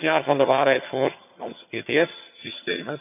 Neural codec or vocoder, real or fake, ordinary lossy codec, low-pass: vocoder, 22.05 kHz, 80 mel bands, HiFi-GAN; fake; none; 3.6 kHz